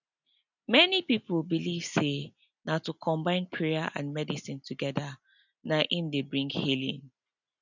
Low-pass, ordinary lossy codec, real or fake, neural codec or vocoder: 7.2 kHz; none; real; none